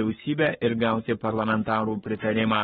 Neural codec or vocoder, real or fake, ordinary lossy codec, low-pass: codec, 44.1 kHz, 7.8 kbps, Pupu-Codec; fake; AAC, 16 kbps; 19.8 kHz